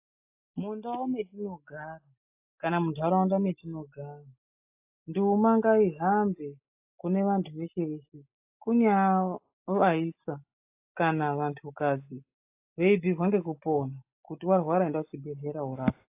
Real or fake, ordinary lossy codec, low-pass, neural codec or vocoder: real; AAC, 24 kbps; 3.6 kHz; none